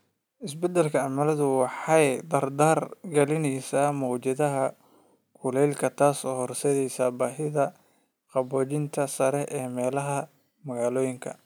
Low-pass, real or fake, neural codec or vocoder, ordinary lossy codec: none; real; none; none